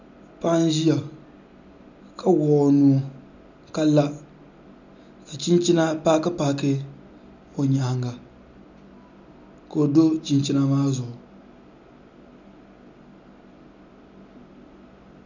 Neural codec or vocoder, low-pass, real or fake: none; 7.2 kHz; real